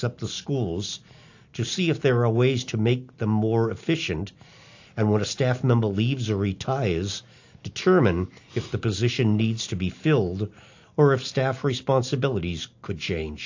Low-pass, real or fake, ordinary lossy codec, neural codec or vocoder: 7.2 kHz; real; AAC, 48 kbps; none